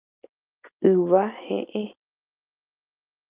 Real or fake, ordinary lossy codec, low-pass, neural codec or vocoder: real; Opus, 24 kbps; 3.6 kHz; none